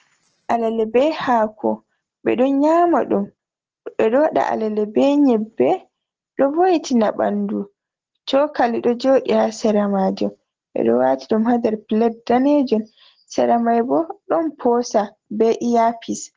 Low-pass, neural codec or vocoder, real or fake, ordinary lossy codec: 7.2 kHz; none; real; Opus, 16 kbps